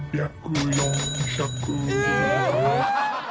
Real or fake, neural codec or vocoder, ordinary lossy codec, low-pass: real; none; none; none